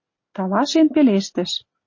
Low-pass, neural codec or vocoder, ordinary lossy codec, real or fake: 7.2 kHz; none; MP3, 32 kbps; real